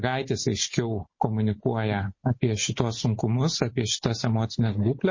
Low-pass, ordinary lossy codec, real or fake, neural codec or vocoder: 7.2 kHz; MP3, 32 kbps; real; none